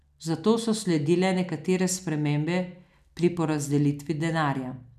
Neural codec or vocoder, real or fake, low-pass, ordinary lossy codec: none; real; 14.4 kHz; none